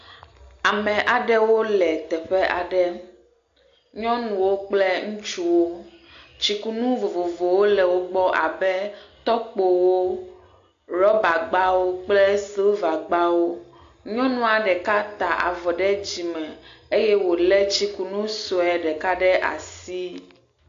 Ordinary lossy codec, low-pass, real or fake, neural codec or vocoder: AAC, 48 kbps; 7.2 kHz; real; none